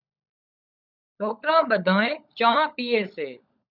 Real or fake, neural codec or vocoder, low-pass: fake; codec, 16 kHz, 16 kbps, FunCodec, trained on LibriTTS, 50 frames a second; 5.4 kHz